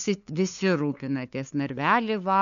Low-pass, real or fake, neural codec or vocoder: 7.2 kHz; fake; codec, 16 kHz, 2 kbps, FunCodec, trained on LibriTTS, 25 frames a second